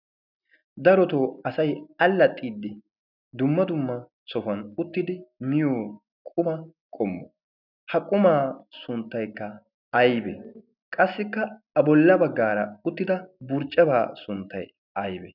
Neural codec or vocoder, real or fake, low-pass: none; real; 5.4 kHz